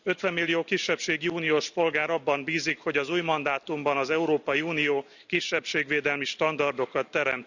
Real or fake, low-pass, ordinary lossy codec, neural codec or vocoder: real; 7.2 kHz; none; none